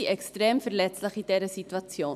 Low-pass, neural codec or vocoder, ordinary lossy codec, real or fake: 14.4 kHz; vocoder, 44.1 kHz, 128 mel bands every 256 samples, BigVGAN v2; none; fake